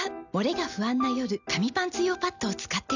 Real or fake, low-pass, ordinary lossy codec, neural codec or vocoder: real; 7.2 kHz; none; none